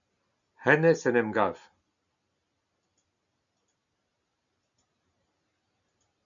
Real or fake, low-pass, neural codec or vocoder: real; 7.2 kHz; none